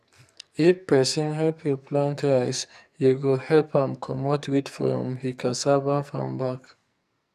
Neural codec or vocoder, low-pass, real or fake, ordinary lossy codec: codec, 44.1 kHz, 2.6 kbps, SNAC; 14.4 kHz; fake; none